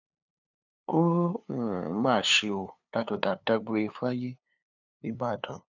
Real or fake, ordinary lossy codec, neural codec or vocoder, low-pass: fake; none; codec, 16 kHz, 2 kbps, FunCodec, trained on LibriTTS, 25 frames a second; 7.2 kHz